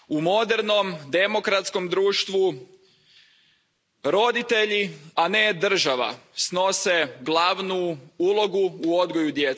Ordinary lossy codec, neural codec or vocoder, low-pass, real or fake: none; none; none; real